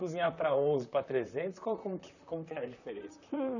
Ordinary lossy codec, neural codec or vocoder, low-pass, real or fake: none; vocoder, 44.1 kHz, 128 mel bands, Pupu-Vocoder; 7.2 kHz; fake